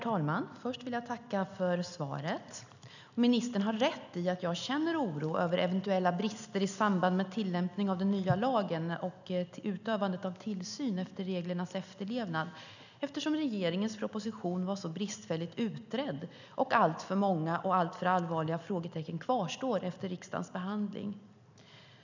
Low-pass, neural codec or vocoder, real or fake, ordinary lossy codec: 7.2 kHz; none; real; none